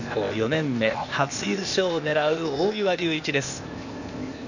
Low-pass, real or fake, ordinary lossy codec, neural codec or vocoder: 7.2 kHz; fake; none; codec, 16 kHz, 0.8 kbps, ZipCodec